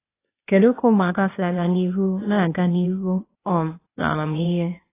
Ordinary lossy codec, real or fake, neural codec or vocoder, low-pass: AAC, 16 kbps; fake; codec, 16 kHz, 0.8 kbps, ZipCodec; 3.6 kHz